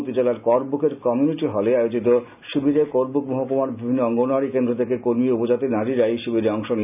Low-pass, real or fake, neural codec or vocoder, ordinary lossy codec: 3.6 kHz; real; none; none